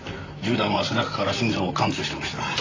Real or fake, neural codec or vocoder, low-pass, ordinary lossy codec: fake; vocoder, 44.1 kHz, 128 mel bands, Pupu-Vocoder; 7.2 kHz; AAC, 32 kbps